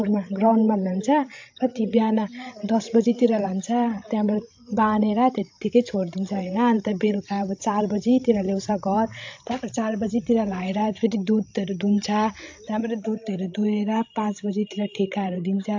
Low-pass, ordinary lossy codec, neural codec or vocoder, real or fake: 7.2 kHz; AAC, 48 kbps; codec, 16 kHz, 16 kbps, FreqCodec, larger model; fake